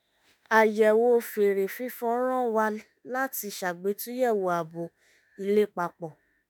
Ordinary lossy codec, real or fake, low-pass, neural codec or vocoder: none; fake; none; autoencoder, 48 kHz, 32 numbers a frame, DAC-VAE, trained on Japanese speech